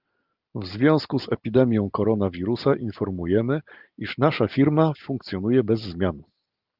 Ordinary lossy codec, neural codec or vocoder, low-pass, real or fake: Opus, 32 kbps; none; 5.4 kHz; real